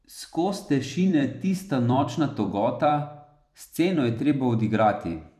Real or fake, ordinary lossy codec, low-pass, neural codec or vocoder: fake; none; 14.4 kHz; vocoder, 44.1 kHz, 128 mel bands every 256 samples, BigVGAN v2